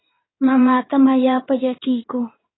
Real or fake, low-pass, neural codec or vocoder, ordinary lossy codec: fake; 7.2 kHz; codec, 16 kHz in and 24 kHz out, 2.2 kbps, FireRedTTS-2 codec; AAC, 16 kbps